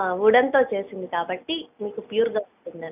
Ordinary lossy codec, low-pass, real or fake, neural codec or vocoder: none; 3.6 kHz; real; none